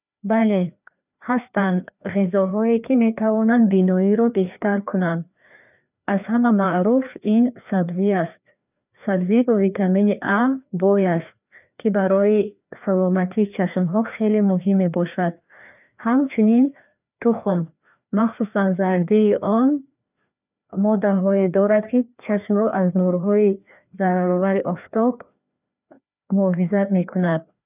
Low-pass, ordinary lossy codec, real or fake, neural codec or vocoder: 3.6 kHz; none; fake; codec, 16 kHz, 2 kbps, FreqCodec, larger model